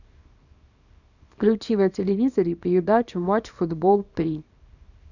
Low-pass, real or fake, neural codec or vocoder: 7.2 kHz; fake; codec, 24 kHz, 0.9 kbps, WavTokenizer, small release